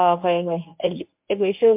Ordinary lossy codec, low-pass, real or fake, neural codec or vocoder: MP3, 32 kbps; 3.6 kHz; fake; codec, 24 kHz, 0.9 kbps, WavTokenizer, medium speech release version 1